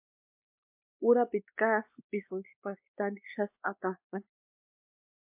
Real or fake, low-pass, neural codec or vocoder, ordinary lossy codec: fake; 3.6 kHz; codec, 16 kHz, 2 kbps, X-Codec, WavLM features, trained on Multilingual LibriSpeech; MP3, 32 kbps